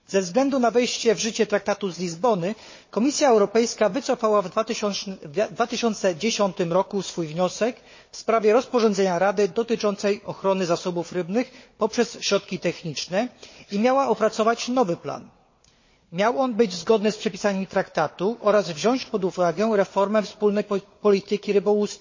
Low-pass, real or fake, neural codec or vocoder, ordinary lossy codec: 7.2 kHz; fake; codec, 16 kHz, 4 kbps, FunCodec, trained on Chinese and English, 50 frames a second; MP3, 32 kbps